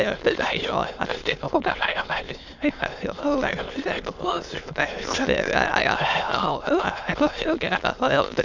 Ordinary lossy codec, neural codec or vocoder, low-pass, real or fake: none; autoencoder, 22.05 kHz, a latent of 192 numbers a frame, VITS, trained on many speakers; 7.2 kHz; fake